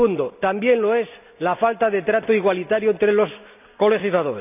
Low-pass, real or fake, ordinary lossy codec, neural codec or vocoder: 3.6 kHz; real; none; none